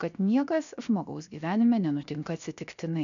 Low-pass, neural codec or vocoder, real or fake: 7.2 kHz; codec, 16 kHz, 0.7 kbps, FocalCodec; fake